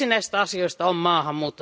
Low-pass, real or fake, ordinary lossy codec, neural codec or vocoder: none; real; none; none